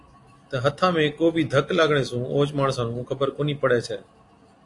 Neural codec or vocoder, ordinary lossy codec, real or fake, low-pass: none; AAC, 48 kbps; real; 10.8 kHz